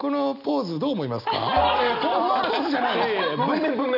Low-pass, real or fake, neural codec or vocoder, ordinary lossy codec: 5.4 kHz; real; none; none